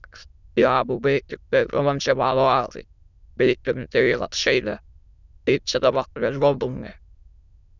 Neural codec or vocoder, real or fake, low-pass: autoencoder, 22.05 kHz, a latent of 192 numbers a frame, VITS, trained on many speakers; fake; 7.2 kHz